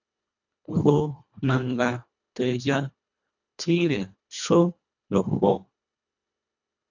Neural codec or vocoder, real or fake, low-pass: codec, 24 kHz, 1.5 kbps, HILCodec; fake; 7.2 kHz